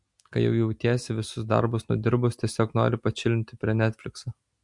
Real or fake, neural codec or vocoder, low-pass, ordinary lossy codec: real; none; 10.8 kHz; MP3, 64 kbps